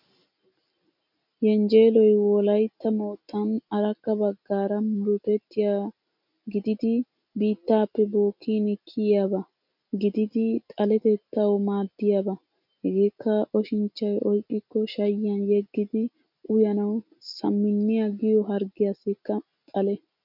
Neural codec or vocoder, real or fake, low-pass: none; real; 5.4 kHz